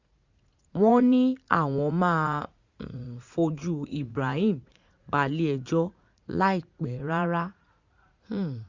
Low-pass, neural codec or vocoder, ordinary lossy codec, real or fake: 7.2 kHz; vocoder, 44.1 kHz, 80 mel bands, Vocos; Opus, 64 kbps; fake